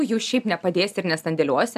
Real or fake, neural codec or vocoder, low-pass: real; none; 14.4 kHz